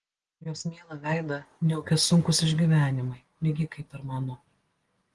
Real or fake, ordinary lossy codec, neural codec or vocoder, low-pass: real; Opus, 24 kbps; none; 10.8 kHz